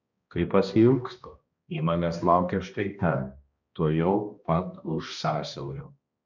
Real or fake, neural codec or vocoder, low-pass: fake; codec, 16 kHz, 1 kbps, X-Codec, HuBERT features, trained on balanced general audio; 7.2 kHz